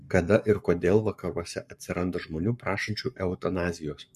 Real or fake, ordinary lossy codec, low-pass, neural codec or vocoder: fake; MP3, 64 kbps; 14.4 kHz; codec, 44.1 kHz, 7.8 kbps, Pupu-Codec